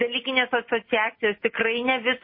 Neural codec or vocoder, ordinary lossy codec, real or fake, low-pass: none; MP3, 24 kbps; real; 5.4 kHz